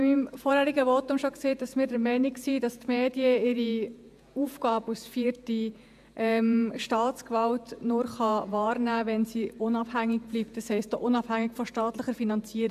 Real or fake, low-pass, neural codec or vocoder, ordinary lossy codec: fake; 14.4 kHz; vocoder, 48 kHz, 128 mel bands, Vocos; AAC, 96 kbps